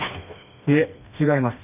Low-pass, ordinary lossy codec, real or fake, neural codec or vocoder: 3.6 kHz; none; fake; codec, 16 kHz, 2 kbps, FreqCodec, smaller model